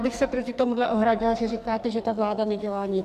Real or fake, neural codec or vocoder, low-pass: fake; codec, 44.1 kHz, 2.6 kbps, SNAC; 14.4 kHz